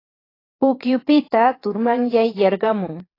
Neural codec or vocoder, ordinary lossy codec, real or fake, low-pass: vocoder, 22.05 kHz, 80 mel bands, Vocos; AAC, 24 kbps; fake; 5.4 kHz